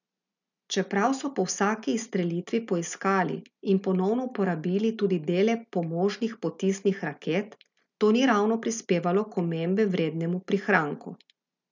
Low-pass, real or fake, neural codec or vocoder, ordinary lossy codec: 7.2 kHz; real; none; none